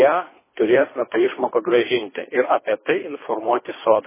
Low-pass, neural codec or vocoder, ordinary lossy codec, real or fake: 3.6 kHz; codec, 24 kHz, 3 kbps, HILCodec; MP3, 16 kbps; fake